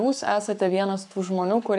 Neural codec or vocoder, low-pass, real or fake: codec, 44.1 kHz, 7.8 kbps, Pupu-Codec; 10.8 kHz; fake